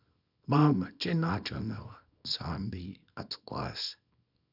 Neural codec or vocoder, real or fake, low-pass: codec, 24 kHz, 0.9 kbps, WavTokenizer, small release; fake; 5.4 kHz